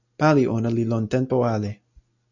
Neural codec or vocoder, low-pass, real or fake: none; 7.2 kHz; real